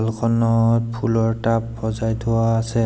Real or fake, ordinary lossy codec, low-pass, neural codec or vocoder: real; none; none; none